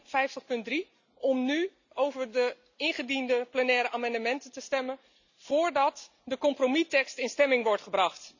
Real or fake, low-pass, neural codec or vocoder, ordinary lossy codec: real; 7.2 kHz; none; none